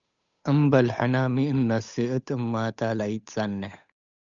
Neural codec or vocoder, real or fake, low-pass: codec, 16 kHz, 8 kbps, FunCodec, trained on Chinese and English, 25 frames a second; fake; 7.2 kHz